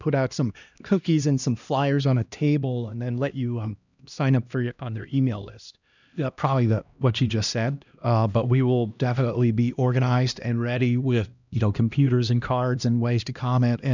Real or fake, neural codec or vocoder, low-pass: fake; codec, 16 kHz, 1 kbps, X-Codec, HuBERT features, trained on LibriSpeech; 7.2 kHz